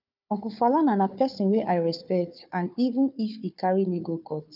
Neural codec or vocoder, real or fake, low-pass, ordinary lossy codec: codec, 16 kHz, 4 kbps, FunCodec, trained on Chinese and English, 50 frames a second; fake; 5.4 kHz; none